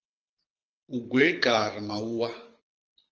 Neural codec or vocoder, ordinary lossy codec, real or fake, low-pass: codec, 24 kHz, 6 kbps, HILCodec; Opus, 24 kbps; fake; 7.2 kHz